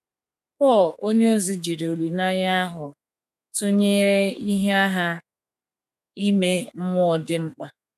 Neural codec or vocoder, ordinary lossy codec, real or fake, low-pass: codec, 32 kHz, 1.9 kbps, SNAC; none; fake; 14.4 kHz